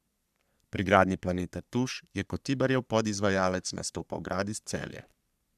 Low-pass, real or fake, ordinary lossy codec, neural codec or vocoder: 14.4 kHz; fake; none; codec, 44.1 kHz, 3.4 kbps, Pupu-Codec